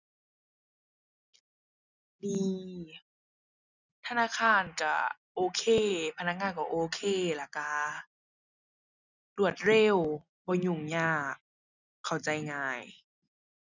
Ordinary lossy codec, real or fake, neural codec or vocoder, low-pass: none; real; none; 7.2 kHz